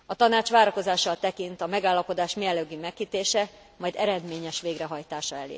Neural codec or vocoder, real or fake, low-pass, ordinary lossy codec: none; real; none; none